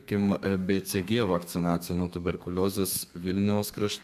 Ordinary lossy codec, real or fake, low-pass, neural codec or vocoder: MP3, 96 kbps; fake; 14.4 kHz; codec, 32 kHz, 1.9 kbps, SNAC